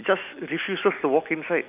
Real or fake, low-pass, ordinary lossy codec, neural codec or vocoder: real; 3.6 kHz; none; none